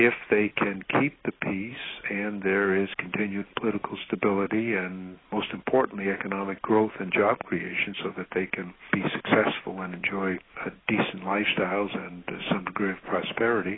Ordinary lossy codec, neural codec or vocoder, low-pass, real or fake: AAC, 16 kbps; none; 7.2 kHz; real